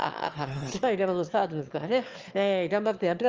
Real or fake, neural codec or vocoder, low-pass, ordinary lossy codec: fake; autoencoder, 22.05 kHz, a latent of 192 numbers a frame, VITS, trained on one speaker; 7.2 kHz; Opus, 24 kbps